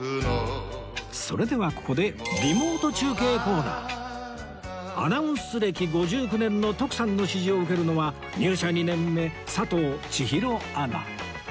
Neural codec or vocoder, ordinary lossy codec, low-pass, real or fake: none; none; none; real